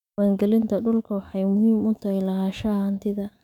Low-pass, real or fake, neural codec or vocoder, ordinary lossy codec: 19.8 kHz; fake; autoencoder, 48 kHz, 128 numbers a frame, DAC-VAE, trained on Japanese speech; none